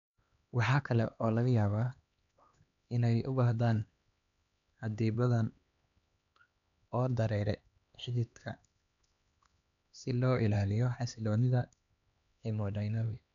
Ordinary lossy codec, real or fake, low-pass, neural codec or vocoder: none; fake; 7.2 kHz; codec, 16 kHz, 2 kbps, X-Codec, HuBERT features, trained on LibriSpeech